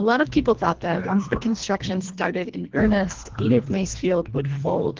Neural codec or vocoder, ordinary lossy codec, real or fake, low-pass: codec, 24 kHz, 1.5 kbps, HILCodec; Opus, 16 kbps; fake; 7.2 kHz